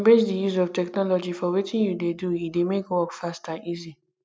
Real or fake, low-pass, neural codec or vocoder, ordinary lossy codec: real; none; none; none